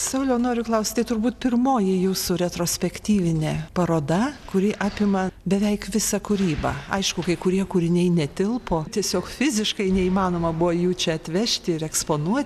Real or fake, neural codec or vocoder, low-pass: real; none; 14.4 kHz